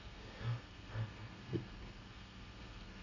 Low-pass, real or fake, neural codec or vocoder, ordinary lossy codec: 7.2 kHz; fake; codec, 32 kHz, 1.9 kbps, SNAC; none